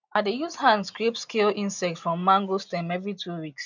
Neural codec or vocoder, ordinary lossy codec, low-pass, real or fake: none; none; 7.2 kHz; real